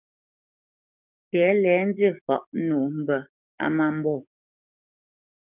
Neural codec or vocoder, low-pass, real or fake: codec, 44.1 kHz, 7.8 kbps, DAC; 3.6 kHz; fake